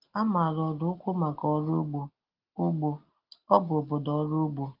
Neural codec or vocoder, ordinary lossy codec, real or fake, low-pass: none; Opus, 16 kbps; real; 5.4 kHz